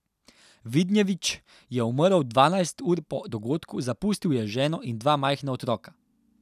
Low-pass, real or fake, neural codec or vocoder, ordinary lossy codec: 14.4 kHz; real; none; none